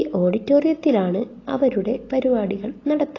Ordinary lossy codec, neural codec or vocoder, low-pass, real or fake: AAC, 32 kbps; none; 7.2 kHz; real